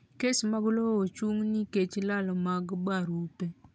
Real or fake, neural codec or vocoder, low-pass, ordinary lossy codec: real; none; none; none